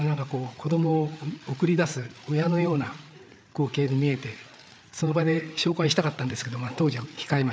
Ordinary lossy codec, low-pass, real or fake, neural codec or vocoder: none; none; fake; codec, 16 kHz, 8 kbps, FreqCodec, larger model